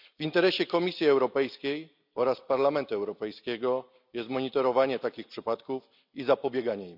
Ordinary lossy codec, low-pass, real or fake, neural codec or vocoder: none; 5.4 kHz; real; none